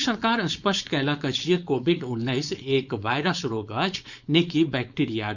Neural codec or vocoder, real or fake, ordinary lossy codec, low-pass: codec, 16 kHz, 4.8 kbps, FACodec; fake; none; 7.2 kHz